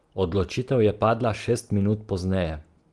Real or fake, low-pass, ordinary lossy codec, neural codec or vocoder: real; 10.8 kHz; Opus, 24 kbps; none